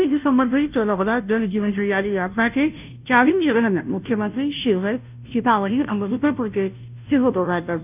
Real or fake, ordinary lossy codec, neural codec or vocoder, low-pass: fake; none; codec, 16 kHz, 0.5 kbps, FunCodec, trained on Chinese and English, 25 frames a second; 3.6 kHz